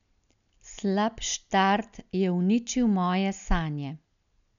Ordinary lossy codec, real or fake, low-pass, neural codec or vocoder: none; real; 7.2 kHz; none